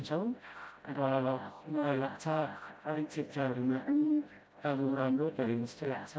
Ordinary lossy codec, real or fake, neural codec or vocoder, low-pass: none; fake; codec, 16 kHz, 0.5 kbps, FreqCodec, smaller model; none